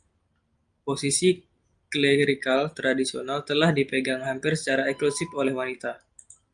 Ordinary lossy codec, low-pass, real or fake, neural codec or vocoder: Opus, 32 kbps; 9.9 kHz; real; none